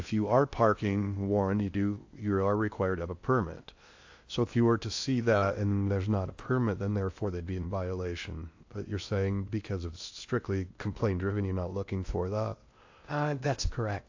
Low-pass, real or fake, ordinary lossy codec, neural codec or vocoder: 7.2 kHz; fake; AAC, 48 kbps; codec, 16 kHz in and 24 kHz out, 0.6 kbps, FocalCodec, streaming, 2048 codes